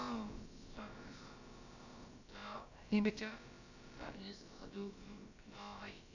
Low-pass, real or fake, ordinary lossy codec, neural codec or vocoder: 7.2 kHz; fake; AAC, 48 kbps; codec, 16 kHz, about 1 kbps, DyCAST, with the encoder's durations